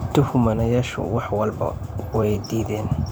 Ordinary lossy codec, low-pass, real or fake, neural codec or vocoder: none; none; fake; vocoder, 44.1 kHz, 128 mel bands every 512 samples, BigVGAN v2